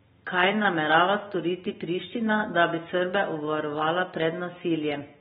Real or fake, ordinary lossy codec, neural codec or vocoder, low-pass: real; AAC, 16 kbps; none; 7.2 kHz